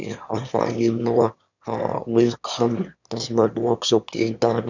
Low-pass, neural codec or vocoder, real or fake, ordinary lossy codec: 7.2 kHz; autoencoder, 22.05 kHz, a latent of 192 numbers a frame, VITS, trained on one speaker; fake; none